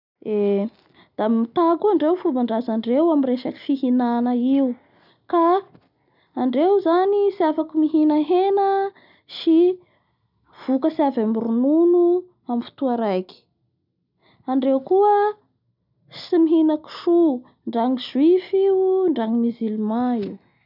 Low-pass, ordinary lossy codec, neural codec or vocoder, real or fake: 5.4 kHz; none; none; real